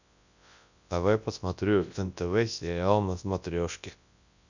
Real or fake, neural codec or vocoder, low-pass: fake; codec, 24 kHz, 0.9 kbps, WavTokenizer, large speech release; 7.2 kHz